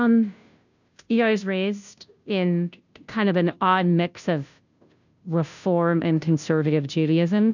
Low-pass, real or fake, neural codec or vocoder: 7.2 kHz; fake; codec, 16 kHz, 0.5 kbps, FunCodec, trained on Chinese and English, 25 frames a second